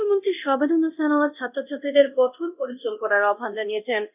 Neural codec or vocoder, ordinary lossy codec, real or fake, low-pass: codec, 24 kHz, 0.9 kbps, DualCodec; none; fake; 3.6 kHz